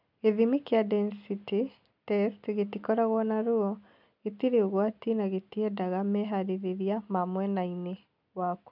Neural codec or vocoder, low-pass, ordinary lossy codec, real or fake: none; 5.4 kHz; none; real